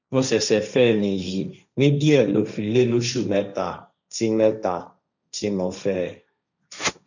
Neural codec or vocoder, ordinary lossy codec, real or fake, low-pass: codec, 16 kHz, 1.1 kbps, Voila-Tokenizer; none; fake; 7.2 kHz